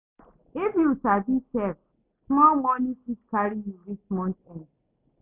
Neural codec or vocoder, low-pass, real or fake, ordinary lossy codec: none; 3.6 kHz; real; none